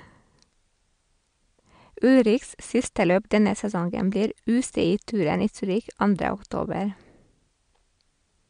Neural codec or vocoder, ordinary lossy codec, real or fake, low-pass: none; MP3, 64 kbps; real; 9.9 kHz